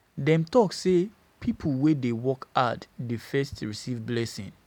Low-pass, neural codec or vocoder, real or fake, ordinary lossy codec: 19.8 kHz; none; real; none